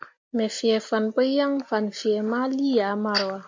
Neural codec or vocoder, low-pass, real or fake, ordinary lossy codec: none; 7.2 kHz; real; MP3, 64 kbps